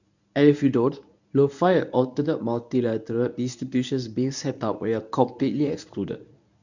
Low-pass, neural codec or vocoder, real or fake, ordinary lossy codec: 7.2 kHz; codec, 24 kHz, 0.9 kbps, WavTokenizer, medium speech release version 1; fake; none